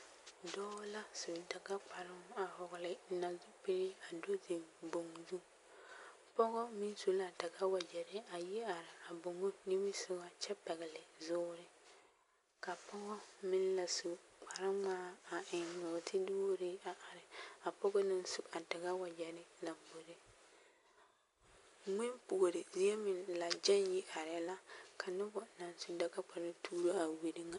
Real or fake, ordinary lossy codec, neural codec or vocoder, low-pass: real; AAC, 64 kbps; none; 10.8 kHz